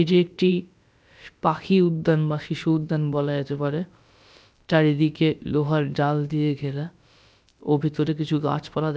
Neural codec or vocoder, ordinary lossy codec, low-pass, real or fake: codec, 16 kHz, about 1 kbps, DyCAST, with the encoder's durations; none; none; fake